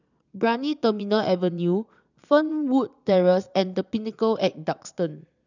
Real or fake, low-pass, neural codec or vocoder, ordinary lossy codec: fake; 7.2 kHz; vocoder, 22.05 kHz, 80 mel bands, Vocos; none